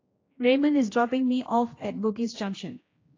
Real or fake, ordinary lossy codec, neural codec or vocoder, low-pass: fake; AAC, 32 kbps; codec, 16 kHz, 1 kbps, X-Codec, HuBERT features, trained on general audio; 7.2 kHz